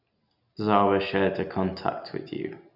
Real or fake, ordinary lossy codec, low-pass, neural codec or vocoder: real; none; 5.4 kHz; none